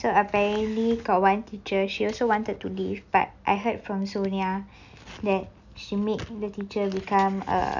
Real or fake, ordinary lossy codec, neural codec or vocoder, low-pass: real; none; none; 7.2 kHz